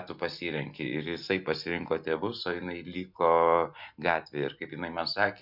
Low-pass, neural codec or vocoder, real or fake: 5.4 kHz; none; real